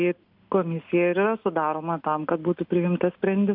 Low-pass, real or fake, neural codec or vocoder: 5.4 kHz; real; none